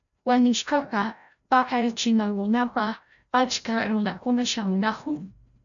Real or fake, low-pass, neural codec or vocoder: fake; 7.2 kHz; codec, 16 kHz, 0.5 kbps, FreqCodec, larger model